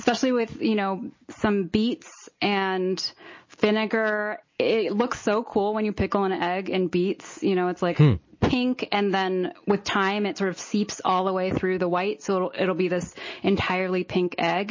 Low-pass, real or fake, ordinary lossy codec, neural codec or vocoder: 7.2 kHz; real; MP3, 32 kbps; none